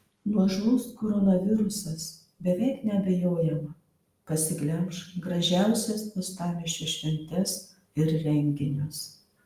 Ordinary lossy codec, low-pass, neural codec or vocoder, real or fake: Opus, 32 kbps; 14.4 kHz; none; real